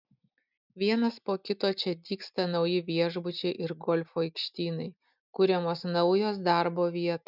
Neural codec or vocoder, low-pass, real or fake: none; 5.4 kHz; real